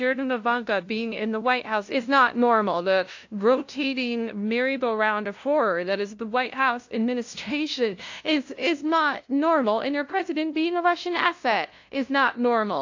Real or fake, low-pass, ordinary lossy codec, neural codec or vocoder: fake; 7.2 kHz; AAC, 48 kbps; codec, 16 kHz, 0.5 kbps, FunCodec, trained on LibriTTS, 25 frames a second